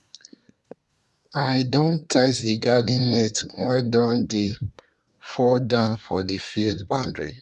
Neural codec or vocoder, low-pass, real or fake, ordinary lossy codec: codec, 24 kHz, 1 kbps, SNAC; none; fake; none